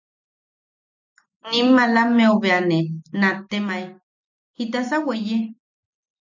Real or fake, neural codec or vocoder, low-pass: real; none; 7.2 kHz